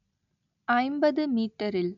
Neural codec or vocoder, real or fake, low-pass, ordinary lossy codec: none; real; 7.2 kHz; none